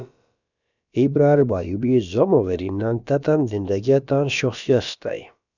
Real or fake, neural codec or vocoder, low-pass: fake; codec, 16 kHz, about 1 kbps, DyCAST, with the encoder's durations; 7.2 kHz